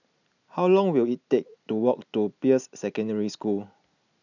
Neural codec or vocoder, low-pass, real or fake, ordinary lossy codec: none; 7.2 kHz; real; none